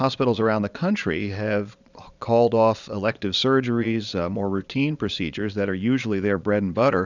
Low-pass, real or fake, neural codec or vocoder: 7.2 kHz; fake; vocoder, 22.05 kHz, 80 mel bands, Vocos